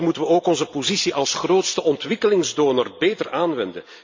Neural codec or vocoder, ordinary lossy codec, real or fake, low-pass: vocoder, 44.1 kHz, 128 mel bands every 256 samples, BigVGAN v2; MP3, 64 kbps; fake; 7.2 kHz